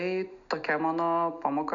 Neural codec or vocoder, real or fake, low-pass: none; real; 7.2 kHz